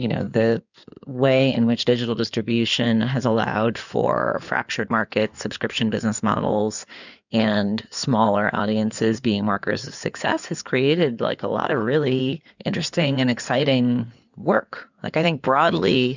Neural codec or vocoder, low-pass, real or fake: codec, 16 kHz in and 24 kHz out, 2.2 kbps, FireRedTTS-2 codec; 7.2 kHz; fake